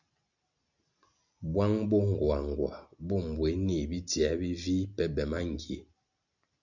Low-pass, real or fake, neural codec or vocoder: 7.2 kHz; real; none